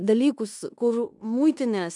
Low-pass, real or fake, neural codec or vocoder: 10.8 kHz; fake; codec, 16 kHz in and 24 kHz out, 0.9 kbps, LongCat-Audio-Codec, fine tuned four codebook decoder